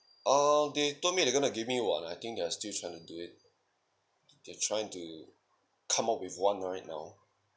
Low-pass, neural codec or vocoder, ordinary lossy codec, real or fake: none; none; none; real